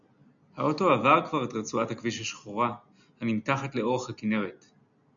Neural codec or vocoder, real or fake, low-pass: none; real; 7.2 kHz